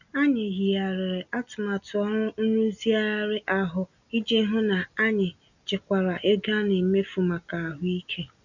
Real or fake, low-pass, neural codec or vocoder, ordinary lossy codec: real; 7.2 kHz; none; none